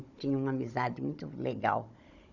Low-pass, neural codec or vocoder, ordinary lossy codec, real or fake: 7.2 kHz; codec, 16 kHz, 16 kbps, FunCodec, trained on Chinese and English, 50 frames a second; none; fake